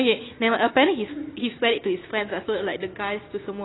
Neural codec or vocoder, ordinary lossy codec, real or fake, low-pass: none; AAC, 16 kbps; real; 7.2 kHz